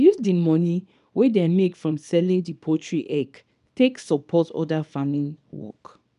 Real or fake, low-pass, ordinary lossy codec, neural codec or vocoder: fake; 10.8 kHz; none; codec, 24 kHz, 0.9 kbps, WavTokenizer, small release